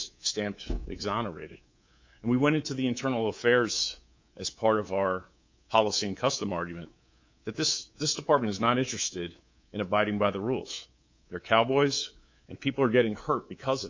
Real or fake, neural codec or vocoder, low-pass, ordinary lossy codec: fake; codec, 24 kHz, 3.1 kbps, DualCodec; 7.2 kHz; MP3, 64 kbps